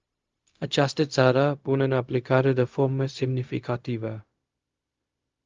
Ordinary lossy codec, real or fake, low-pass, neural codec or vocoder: Opus, 32 kbps; fake; 7.2 kHz; codec, 16 kHz, 0.4 kbps, LongCat-Audio-Codec